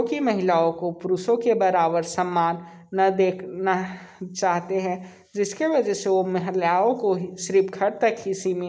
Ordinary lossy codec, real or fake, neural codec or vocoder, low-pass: none; real; none; none